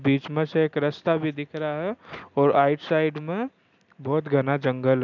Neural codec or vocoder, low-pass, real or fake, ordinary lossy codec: none; 7.2 kHz; real; none